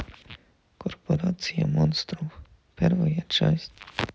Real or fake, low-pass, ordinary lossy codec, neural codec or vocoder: real; none; none; none